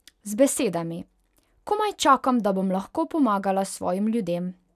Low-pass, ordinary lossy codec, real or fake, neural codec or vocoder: 14.4 kHz; none; real; none